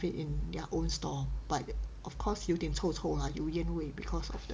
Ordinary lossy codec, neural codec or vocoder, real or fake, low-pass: none; none; real; none